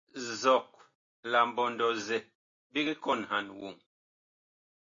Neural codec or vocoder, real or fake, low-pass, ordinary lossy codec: none; real; 7.2 kHz; MP3, 48 kbps